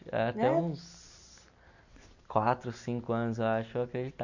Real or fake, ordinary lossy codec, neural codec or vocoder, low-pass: real; none; none; 7.2 kHz